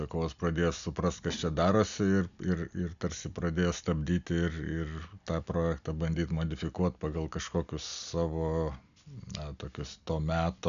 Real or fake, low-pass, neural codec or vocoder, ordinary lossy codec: real; 7.2 kHz; none; MP3, 96 kbps